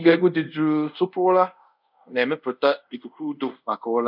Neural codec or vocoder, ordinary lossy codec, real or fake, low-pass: codec, 24 kHz, 0.5 kbps, DualCodec; none; fake; 5.4 kHz